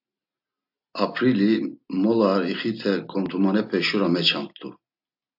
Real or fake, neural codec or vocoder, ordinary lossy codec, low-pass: real; none; AAC, 48 kbps; 5.4 kHz